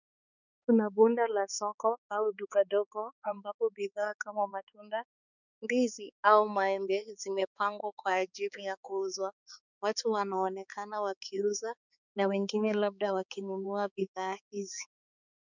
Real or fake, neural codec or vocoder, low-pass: fake; codec, 16 kHz, 4 kbps, X-Codec, HuBERT features, trained on balanced general audio; 7.2 kHz